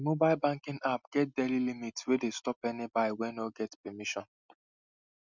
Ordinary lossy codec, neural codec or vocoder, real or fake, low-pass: none; none; real; 7.2 kHz